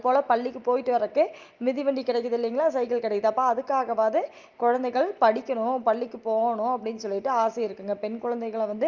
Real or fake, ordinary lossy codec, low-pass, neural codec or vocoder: real; Opus, 24 kbps; 7.2 kHz; none